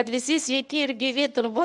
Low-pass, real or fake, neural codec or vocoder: 10.8 kHz; fake; codec, 24 kHz, 0.9 kbps, WavTokenizer, medium speech release version 1